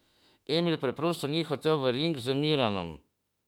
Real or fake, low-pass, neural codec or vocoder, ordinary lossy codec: fake; 19.8 kHz; autoencoder, 48 kHz, 32 numbers a frame, DAC-VAE, trained on Japanese speech; MP3, 96 kbps